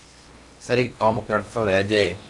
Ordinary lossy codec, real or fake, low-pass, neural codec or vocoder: AAC, 64 kbps; fake; 10.8 kHz; codec, 16 kHz in and 24 kHz out, 0.8 kbps, FocalCodec, streaming, 65536 codes